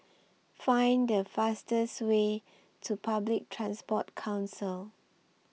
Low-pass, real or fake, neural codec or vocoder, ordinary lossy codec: none; real; none; none